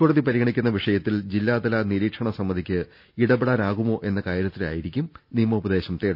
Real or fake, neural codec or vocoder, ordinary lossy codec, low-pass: real; none; none; 5.4 kHz